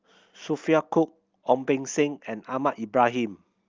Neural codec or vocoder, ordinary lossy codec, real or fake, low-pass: none; Opus, 24 kbps; real; 7.2 kHz